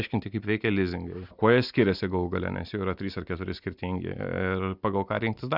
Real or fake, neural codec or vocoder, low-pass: real; none; 5.4 kHz